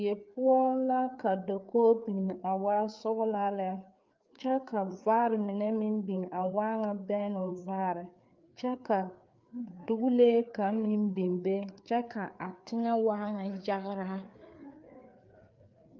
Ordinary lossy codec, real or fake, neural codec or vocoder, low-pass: Opus, 24 kbps; fake; codec, 16 kHz, 4 kbps, FreqCodec, larger model; 7.2 kHz